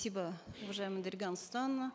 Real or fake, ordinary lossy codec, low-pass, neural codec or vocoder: real; none; none; none